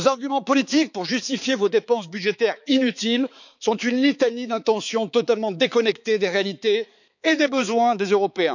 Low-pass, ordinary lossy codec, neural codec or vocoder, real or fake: 7.2 kHz; none; codec, 16 kHz, 4 kbps, X-Codec, HuBERT features, trained on balanced general audio; fake